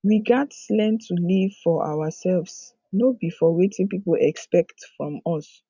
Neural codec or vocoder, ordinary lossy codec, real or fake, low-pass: none; none; real; 7.2 kHz